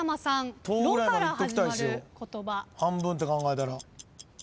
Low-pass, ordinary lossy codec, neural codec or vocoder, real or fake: none; none; none; real